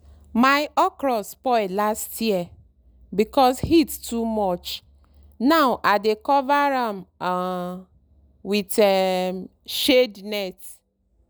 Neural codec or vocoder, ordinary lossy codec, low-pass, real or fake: none; none; none; real